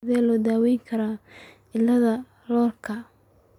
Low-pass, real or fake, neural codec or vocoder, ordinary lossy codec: 19.8 kHz; real; none; none